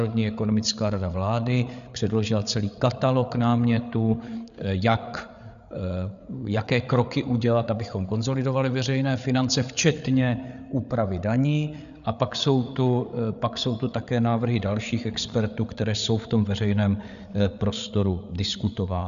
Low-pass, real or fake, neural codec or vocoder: 7.2 kHz; fake; codec, 16 kHz, 8 kbps, FreqCodec, larger model